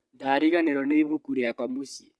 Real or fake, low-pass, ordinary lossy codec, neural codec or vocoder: fake; none; none; vocoder, 22.05 kHz, 80 mel bands, WaveNeXt